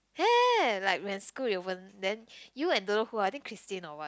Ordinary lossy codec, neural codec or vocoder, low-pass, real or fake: none; none; none; real